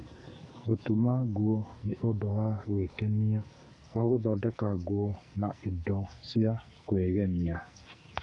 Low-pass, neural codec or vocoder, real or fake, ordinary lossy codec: 10.8 kHz; codec, 44.1 kHz, 2.6 kbps, SNAC; fake; none